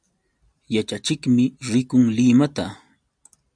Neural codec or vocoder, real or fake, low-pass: none; real; 9.9 kHz